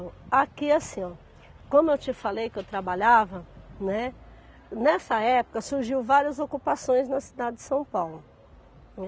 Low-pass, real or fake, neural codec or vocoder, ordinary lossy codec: none; real; none; none